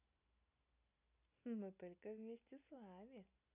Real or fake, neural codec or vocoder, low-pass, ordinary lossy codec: real; none; 3.6 kHz; none